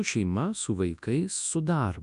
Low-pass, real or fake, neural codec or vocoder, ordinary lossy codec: 10.8 kHz; fake; codec, 24 kHz, 0.9 kbps, WavTokenizer, large speech release; MP3, 96 kbps